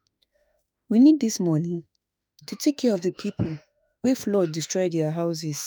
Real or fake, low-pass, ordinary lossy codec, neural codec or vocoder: fake; none; none; autoencoder, 48 kHz, 32 numbers a frame, DAC-VAE, trained on Japanese speech